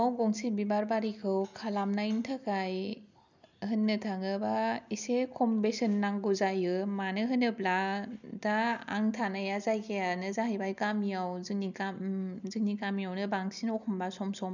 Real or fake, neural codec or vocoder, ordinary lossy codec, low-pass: real; none; none; 7.2 kHz